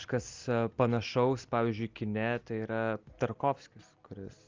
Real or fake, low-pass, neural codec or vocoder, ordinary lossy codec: real; 7.2 kHz; none; Opus, 32 kbps